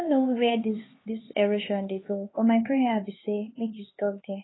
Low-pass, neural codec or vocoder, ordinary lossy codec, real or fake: 7.2 kHz; codec, 16 kHz, 4 kbps, X-Codec, HuBERT features, trained on LibriSpeech; AAC, 16 kbps; fake